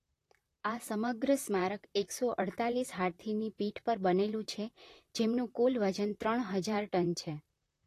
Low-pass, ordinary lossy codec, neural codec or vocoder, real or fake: 14.4 kHz; AAC, 48 kbps; vocoder, 44.1 kHz, 128 mel bands, Pupu-Vocoder; fake